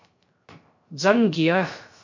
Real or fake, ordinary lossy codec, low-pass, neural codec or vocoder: fake; MP3, 48 kbps; 7.2 kHz; codec, 16 kHz, 0.3 kbps, FocalCodec